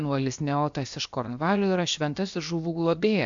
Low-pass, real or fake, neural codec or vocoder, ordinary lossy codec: 7.2 kHz; fake; codec, 16 kHz, 0.7 kbps, FocalCodec; MP3, 64 kbps